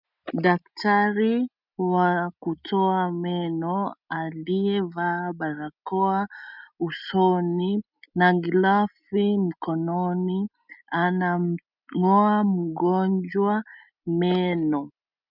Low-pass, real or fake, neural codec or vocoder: 5.4 kHz; real; none